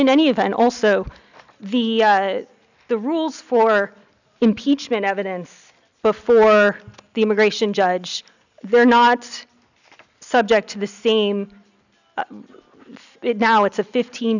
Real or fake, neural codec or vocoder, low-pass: real; none; 7.2 kHz